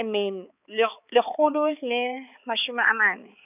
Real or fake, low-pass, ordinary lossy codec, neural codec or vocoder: fake; 3.6 kHz; none; codec, 16 kHz, 4 kbps, X-Codec, HuBERT features, trained on balanced general audio